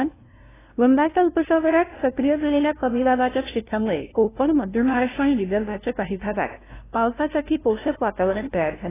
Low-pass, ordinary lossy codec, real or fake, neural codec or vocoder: 3.6 kHz; AAC, 16 kbps; fake; codec, 16 kHz, 0.5 kbps, FunCodec, trained on LibriTTS, 25 frames a second